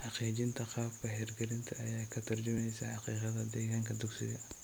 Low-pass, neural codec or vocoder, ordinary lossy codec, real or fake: none; vocoder, 44.1 kHz, 128 mel bands every 256 samples, BigVGAN v2; none; fake